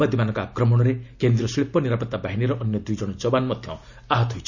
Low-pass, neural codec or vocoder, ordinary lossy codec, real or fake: 7.2 kHz; none; none; real